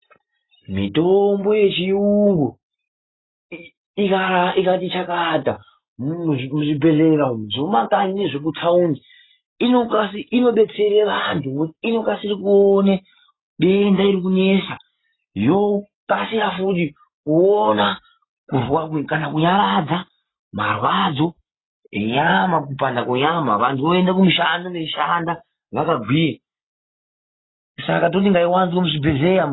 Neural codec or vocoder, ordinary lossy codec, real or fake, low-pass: none; AAC, 16 kbps; real; 7.2 kHz